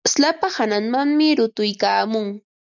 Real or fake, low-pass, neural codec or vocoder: real; 7.2 kHz; none